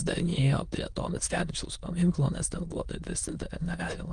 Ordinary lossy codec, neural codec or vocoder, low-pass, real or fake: Opus, 32 kbps; autoencoder, 22.05 kHz, a latent of 192 numbers a frame, VITS, trained on many speakers; 9.9 kHz; fake